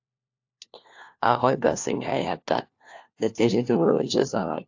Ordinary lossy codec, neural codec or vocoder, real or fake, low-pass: AAC, 48 kbps; codec, 16 kHz, 1 kbps, FunCodec, trained on LibriTTS, 50 frames a second; fake; 7.2 kHz